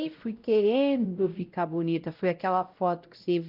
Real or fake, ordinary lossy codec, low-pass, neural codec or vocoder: fake; Opus, 32 kbps; 5.4 kHz; codec, 16 kHz, 0.5 kbps, X-Codec, WavLM features, trained on Multilingual LibriSpeech